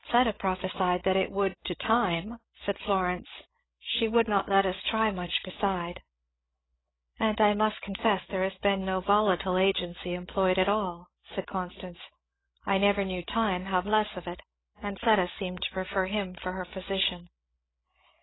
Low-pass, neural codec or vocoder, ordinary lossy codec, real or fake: 7.2 kHz; codec, 16 kHz, 16 kbps, FreqCodec, larger model; AAC, 16 kbps; fake